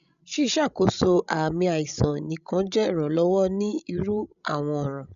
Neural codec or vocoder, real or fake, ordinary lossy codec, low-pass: codec, 16 kHz, 16 kbps, FreqCodec, larger model; fake; none; 7.2 kHz